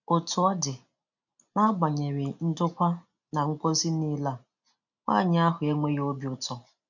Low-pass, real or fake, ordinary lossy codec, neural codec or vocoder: 7.2 kHz; real; none; none